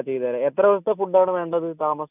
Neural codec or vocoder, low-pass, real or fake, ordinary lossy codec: none; 3.6 kHz; real; none